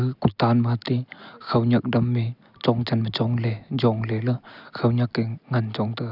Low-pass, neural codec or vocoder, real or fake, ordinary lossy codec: 5.4 kHz; none; real; none